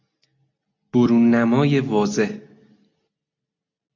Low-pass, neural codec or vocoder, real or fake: 7.2 kHz; none; real